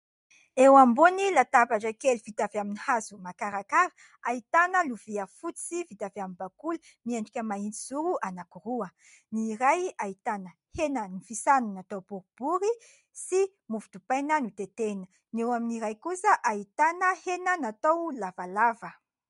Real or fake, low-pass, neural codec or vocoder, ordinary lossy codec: real; 10.8 kHz; none; MP3, 64 kbps